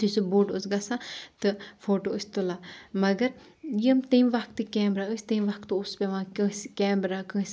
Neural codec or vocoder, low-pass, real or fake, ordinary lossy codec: none; none; real; none